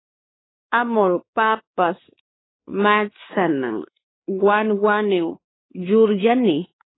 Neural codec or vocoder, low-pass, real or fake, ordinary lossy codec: codec, 16 kHz, 2 kbps, X-Codec, WavLM features, trained on Multilingual LibriSpeech; 7.2 kHz; fake; AAC, 16 kbps